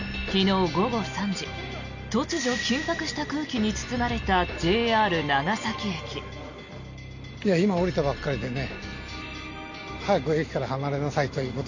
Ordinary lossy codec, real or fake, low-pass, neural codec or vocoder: none; real; 7.2 kHz; none